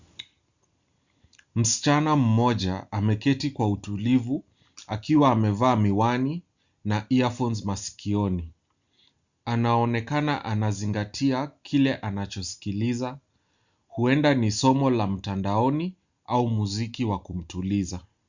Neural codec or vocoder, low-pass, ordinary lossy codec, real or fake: none; 7.2 kHz; Opus, 64 kbps; real